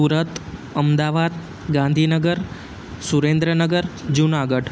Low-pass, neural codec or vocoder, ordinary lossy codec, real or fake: none; none; none; real